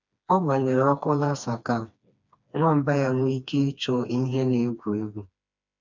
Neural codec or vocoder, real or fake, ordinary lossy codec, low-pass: codec, 16 kHz, 2 kbps, FreqCodec, smaller model; fake; none; 7.2 kHz